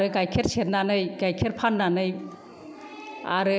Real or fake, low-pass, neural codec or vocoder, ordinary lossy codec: real; none; none; none